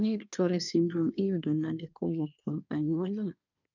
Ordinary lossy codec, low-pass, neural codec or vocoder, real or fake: none; 7.2 kHz; codec, 24 kHz, 1 kbps, SNAC; fake